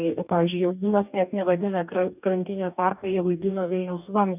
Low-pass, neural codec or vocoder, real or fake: 3.6 kHz; codec, 44.1 kHz, 2.6 kbps, DAC; fake